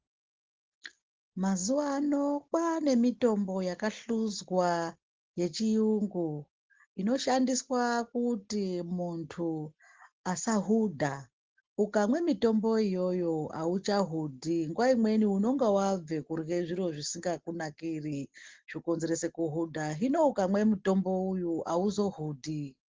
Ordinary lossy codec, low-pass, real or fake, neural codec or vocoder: Opus, 16 kbps; 7.2 kHz; real; none